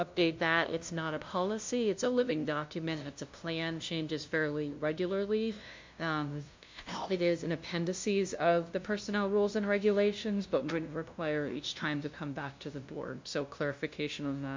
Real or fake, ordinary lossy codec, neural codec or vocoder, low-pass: fake; MP3, 48 kbps; codec, 16 kHz, 0.5 kbps, FunCodec, trained on LibriTTS, 25 frames a second; 7.2 kHz